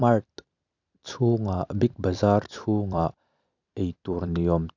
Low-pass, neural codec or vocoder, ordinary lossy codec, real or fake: 7.2 kHz; vocoder, 44.1 kHz, 128 mel bands every 256 samples, BigVGAN v2; none; fake